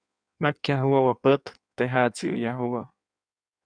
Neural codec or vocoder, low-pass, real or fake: codec, 16 kHz in and 24 kHz out, 1.1 kbps, FireRedTTS-2 codec; 9.9 kHz; fake